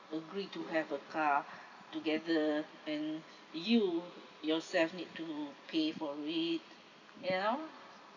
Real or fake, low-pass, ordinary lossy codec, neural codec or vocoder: fake; 7.2 kHz; none; vocoder, 44.1 kHz, 128 mel bands every 256 samples, BigVGAN v2